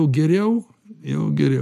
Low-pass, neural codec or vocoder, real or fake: 14.4 kHz; none; real